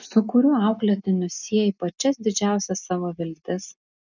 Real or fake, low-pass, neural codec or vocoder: real; 7.2 kHz; none